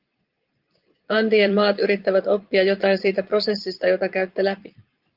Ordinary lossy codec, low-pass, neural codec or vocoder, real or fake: Opus, 32 kbps; 5.4 kHz; vocoder, 22.05 kHz, 80 mel bands, Vocos; fake